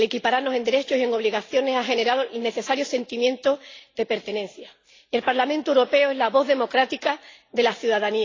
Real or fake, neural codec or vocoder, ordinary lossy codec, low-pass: real; none; AAC, 32 kbps; 7.2 kHz